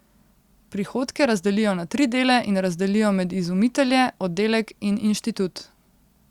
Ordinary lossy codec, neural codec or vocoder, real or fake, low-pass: Opus, 64 kbps; none; real; 19.8 kHz